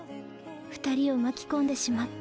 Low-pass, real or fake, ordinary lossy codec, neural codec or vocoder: none; real; none; none